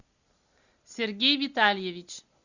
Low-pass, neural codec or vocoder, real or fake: 7.2 kHz; none; real